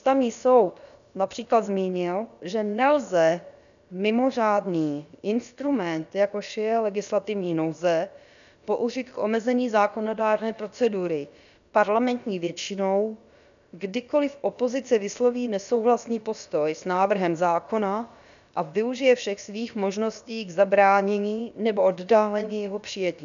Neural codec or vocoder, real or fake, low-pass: codec, 16 kHz, about 1 kbps, DyCAST, with the encoder's durations; fake; 7.2 kHz